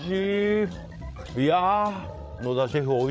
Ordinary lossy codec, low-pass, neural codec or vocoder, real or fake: none; none; codec, 16 kHz, 16 kbps, FreqCodec, larger model; fake